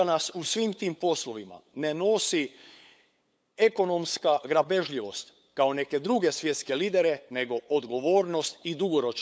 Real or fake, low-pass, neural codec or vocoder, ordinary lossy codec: fake; none; codec, 16 kHz, 8 kbps, FunCodec, trained on LibriTTS, 25 frames a second; none